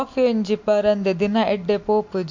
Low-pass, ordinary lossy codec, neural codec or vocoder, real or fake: 7.2 kHz; MP3, 48 kbps; none; real